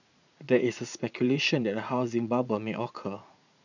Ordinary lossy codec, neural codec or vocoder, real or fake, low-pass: none; vocoder, 44.1 kHz, 80 mel bands, Vocos; fake; 7.2 kHz